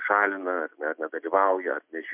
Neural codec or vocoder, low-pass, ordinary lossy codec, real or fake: none; 3.6 kHz; MP3, 32 kbps; real